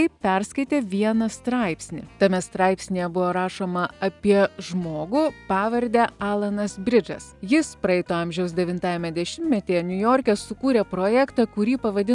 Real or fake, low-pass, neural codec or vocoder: real; 10.8 kHz; none